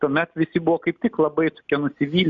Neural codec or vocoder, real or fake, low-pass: none; real; 7.2 kHz